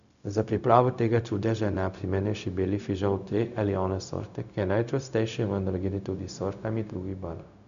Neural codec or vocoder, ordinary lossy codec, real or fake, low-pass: codec, 16 kHz, 0.4 kbps, LongCat-Audio-Codec; none; fake; 7.2 kHz